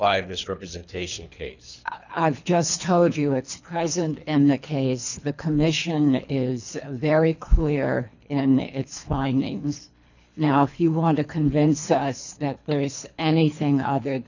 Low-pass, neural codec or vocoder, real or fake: 7.2 kHz; codec, 24 kHz, 3 kbps, HILCodec; fake